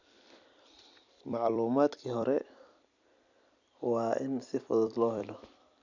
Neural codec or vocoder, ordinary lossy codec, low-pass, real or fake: vocoder, 44.1 kHz, 128 mel bands every 256 samples, BigVGAN v2; none; 7.2 kHz; fake